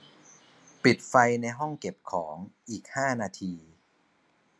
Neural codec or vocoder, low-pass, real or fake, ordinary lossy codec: none; none; real; none